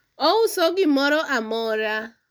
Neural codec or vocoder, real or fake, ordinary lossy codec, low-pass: none; real; none; none